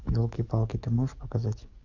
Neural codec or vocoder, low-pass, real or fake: codec, 44.1 kHz, 7.8 kbps, DAC; 7.2 kHz; fake